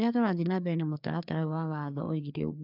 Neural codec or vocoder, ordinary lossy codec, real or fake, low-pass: codec, 16 kHz, 2 kbps, FreqCodec, larger model; none; fake; 5.4 kHz